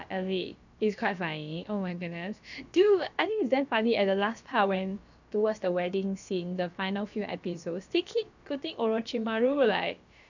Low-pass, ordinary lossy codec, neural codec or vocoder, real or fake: 7.2 kHz; none; codec, 16 kHz, about 1 kbps, DyCAST, with the encoder's durations; fake